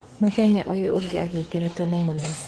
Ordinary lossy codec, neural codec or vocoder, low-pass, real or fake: Opus, 16 kbps; codec, 24 kHz, 1 kbps, SNAC; 10.8 kHz; fake